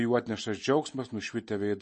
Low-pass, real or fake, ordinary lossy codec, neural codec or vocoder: 10.8 kHz; real; MP3, 32 kbps; none